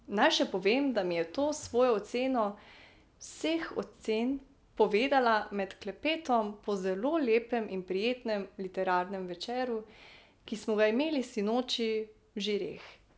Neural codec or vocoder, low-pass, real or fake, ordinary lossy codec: none; none; real; none